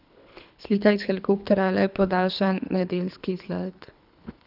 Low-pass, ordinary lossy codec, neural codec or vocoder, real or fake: 5.4 kHz; none; codec, 24 kHz, 3 kbps, HILCodec; fake